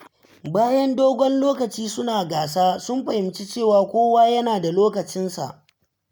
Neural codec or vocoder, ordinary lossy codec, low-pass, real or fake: none; none; none; real